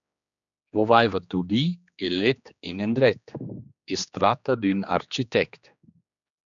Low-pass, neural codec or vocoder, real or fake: 7.2 kHz; codec, 16 kHz, 2 kbps, X-Codec, HuBERT features, trained on general audio; fake